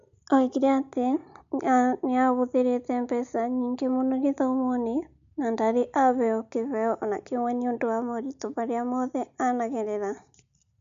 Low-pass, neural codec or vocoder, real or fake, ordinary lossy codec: 7.2 kHz; none; real; MP3, 64 kbps